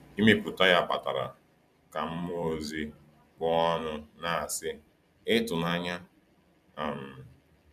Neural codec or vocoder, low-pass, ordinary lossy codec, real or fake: vocoder, 44.1 kHz, 128 mel bands every 256 samples, BigVGAN v2; 14.4 kHz; none; fake